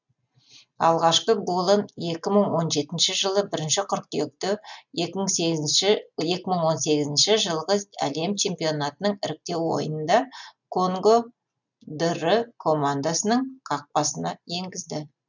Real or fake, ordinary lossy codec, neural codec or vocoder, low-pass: real; none; none; 7.2 kHz